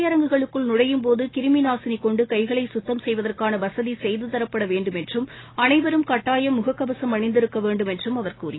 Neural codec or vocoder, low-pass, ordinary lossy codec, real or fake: none; 7.2 kHz; AAC, 16 kbps; real